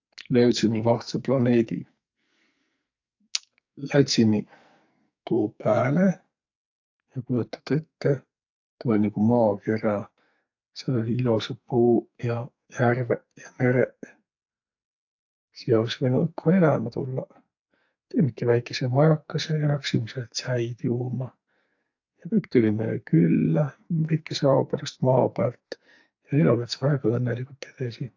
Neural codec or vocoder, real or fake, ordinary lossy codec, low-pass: codec, 44.1 kHz, 2.6 kbps, SNAC; fake; AAC, 48 kbps; 7.2 kHz